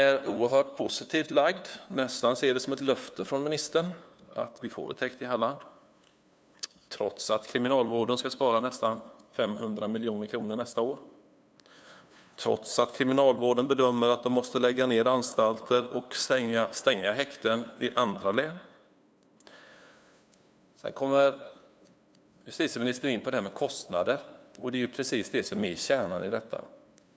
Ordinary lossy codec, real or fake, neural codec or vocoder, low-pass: none; fake; codec, 16 kHz, 2 kbps, FunCodec, trained on LibriTTS, 25 frames a second; none